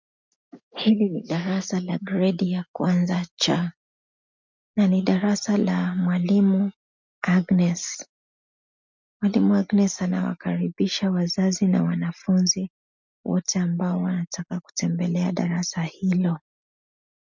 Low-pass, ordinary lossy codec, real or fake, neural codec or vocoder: 7.2 kHz; MP3, 64 kbps; real; none